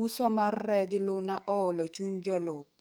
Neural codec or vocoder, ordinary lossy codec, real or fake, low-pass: codec, 44.1 kHz, 2.6 kbps, SNAC; none; fake; none